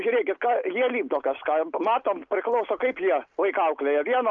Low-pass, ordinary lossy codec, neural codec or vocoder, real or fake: 7.2 kHz; Opus, 24 kbps; none; real